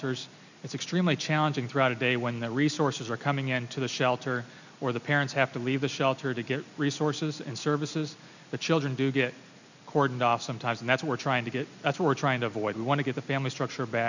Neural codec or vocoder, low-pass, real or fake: none; 7.2 kHz; real